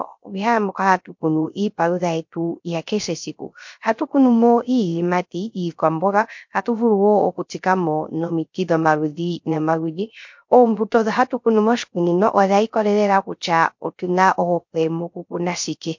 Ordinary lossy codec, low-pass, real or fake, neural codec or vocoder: MP3, 48 kbps; 7.2 kHz; fake; codec, 16 kHz, 0.3 kbps, FocalCodec